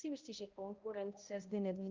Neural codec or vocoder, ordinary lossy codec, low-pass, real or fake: codec, 16 kHz, 0.5 kbps, X-Codec, HuBERT features, trained on balanced general audio; Opus, 32 kbps; 7.2 kHz; fake